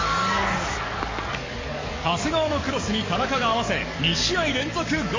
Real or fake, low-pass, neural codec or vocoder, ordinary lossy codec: fake; 7.2 kHz; codec, 44.1 kHz, 7.8 kbps, DAC; MP3, 32 kbps